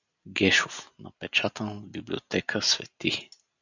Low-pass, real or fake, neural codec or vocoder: 7.2 kHz; real; none